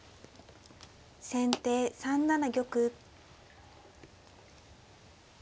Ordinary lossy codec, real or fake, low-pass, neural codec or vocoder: none; real; none; none